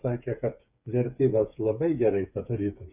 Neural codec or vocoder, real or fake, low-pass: codec, 16 kHz, 8 kbps, FreqCodec, smaller model; fake; 3.6 kHz